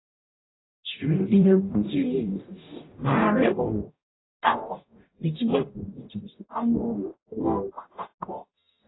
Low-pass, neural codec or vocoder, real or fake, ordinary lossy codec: 7.2 kHz; codec, 44.1 kHz, 0.9 kbps, DAC; fake; AAC, 16 kbps